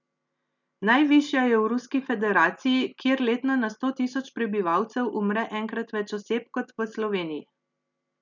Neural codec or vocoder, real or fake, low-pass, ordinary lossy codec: none; real; 7.2 kHz; none